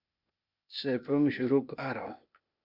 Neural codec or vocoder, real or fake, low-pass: codec, 16 kHz, 0.8 kbps, ZipCodec; fake; 5.4 kHz